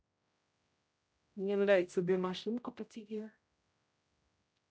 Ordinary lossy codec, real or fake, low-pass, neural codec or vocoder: none; fake; none; codec, 16 kHz, 0.5 kbps, X-Codec, HuBERT features, trained on general audio